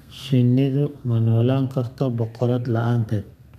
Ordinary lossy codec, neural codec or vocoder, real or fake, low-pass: none; codec, 32 kHz, 1.9 kbps, SNAC; fake; 14.4 kHz